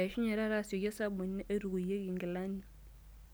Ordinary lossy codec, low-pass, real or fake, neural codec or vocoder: none; none; real; none